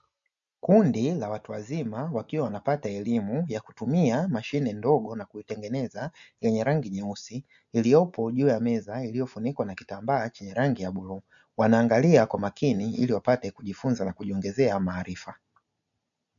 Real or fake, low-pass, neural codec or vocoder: real; 7.2 kHz; none